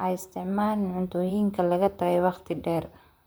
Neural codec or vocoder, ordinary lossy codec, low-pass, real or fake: vocoder, 44.1 kHz, 128 mel bands, Pupu-Vocoder; none; none; fake